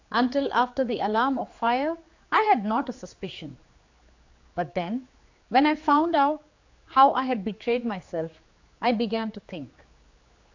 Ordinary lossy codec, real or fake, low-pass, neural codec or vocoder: AAC, 48 kbps; fake; 7.2 kHz; codec, 16 kHz, 4 kbps, X-Codec, HuBERT features, trained on general audio